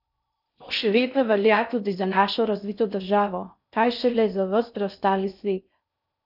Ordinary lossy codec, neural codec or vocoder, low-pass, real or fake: none; codec, 16 kHz in and 24 kHz out, 0.6 kbps, FocalCodec, streaming, 4096 codes; 5.4 kHz; fake